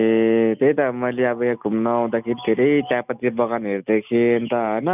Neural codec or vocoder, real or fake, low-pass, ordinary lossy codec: none; real; 3.6 kHz; none